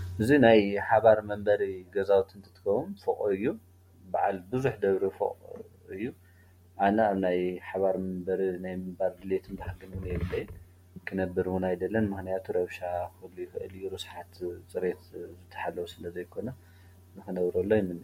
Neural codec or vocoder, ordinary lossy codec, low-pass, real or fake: none; MP3, 64 kbps; 19.8 kHz; real